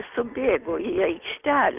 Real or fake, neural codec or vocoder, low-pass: real; none; 3.6 kHz